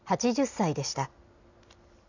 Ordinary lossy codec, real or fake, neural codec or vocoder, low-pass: none; real; none; 7.2 kHz